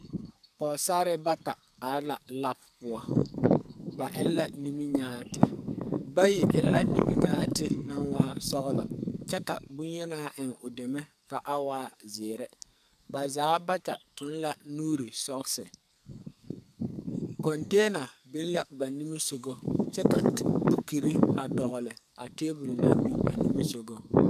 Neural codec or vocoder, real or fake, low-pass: codec, 44.1 kHz, 2.6 kbps, SNAC; fake; 14.4 kHz